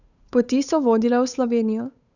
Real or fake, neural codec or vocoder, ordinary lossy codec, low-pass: fake; codec, 16 kHz, 8 kbps, FunCodec, trained on Chinese and English, 25 frames a second; none; 7.2 kHz